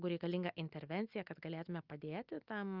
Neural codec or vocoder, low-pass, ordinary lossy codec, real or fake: none; 5.4 kHz; Opus, 24 kbps; real